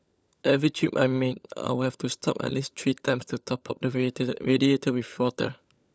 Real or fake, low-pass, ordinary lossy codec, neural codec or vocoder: fake; none; none; codec, 16 kHz, 16 kbps, FunCodec, trained on LibriTTS, 50 frames a second